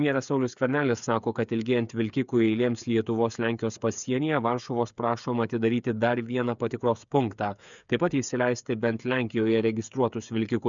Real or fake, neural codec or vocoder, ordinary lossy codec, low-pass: fake; codec, 16 kHz, 8 kbps, FreqCodec, smaller model; MP3, 96 kbps; 7.2 kHz